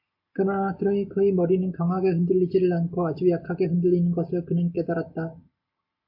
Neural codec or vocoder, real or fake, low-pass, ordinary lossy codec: none; real; 5.4 kHz; AAC, 48 kbps